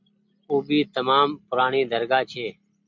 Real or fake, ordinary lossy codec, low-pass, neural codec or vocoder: real; MP3, 64 kbps; 7.2 kHz; none